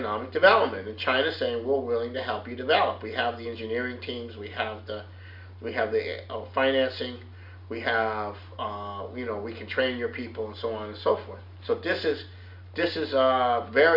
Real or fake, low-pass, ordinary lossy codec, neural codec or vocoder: real; 5.4 kHz; Opus, 64 kbps; none